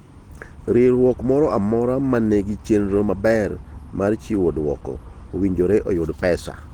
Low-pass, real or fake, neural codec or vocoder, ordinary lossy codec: 19.8 kHz; real; none; Opus, 16 kbps